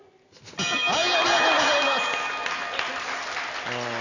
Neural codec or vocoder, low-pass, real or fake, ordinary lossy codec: none; 7.2 kHz; real; none